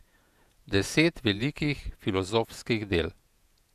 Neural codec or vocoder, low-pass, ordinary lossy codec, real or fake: vocoder, 48 kHz, 128 mel bands, Vocos; 14.4 kHz; none; fake